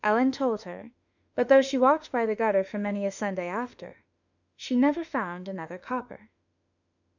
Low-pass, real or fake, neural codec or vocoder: 7.2 kHz; fake; autoencoder, 48 kHz, 32 numbers a frame, DAC-VAE, trained on Japanese speech